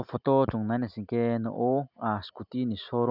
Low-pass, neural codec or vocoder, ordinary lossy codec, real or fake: 5.4 kHz; none; none; real